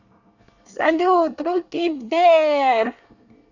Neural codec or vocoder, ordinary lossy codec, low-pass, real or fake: codec, 24 kHz, 1 kbps, SNAC; none; 7.2 kHz; fake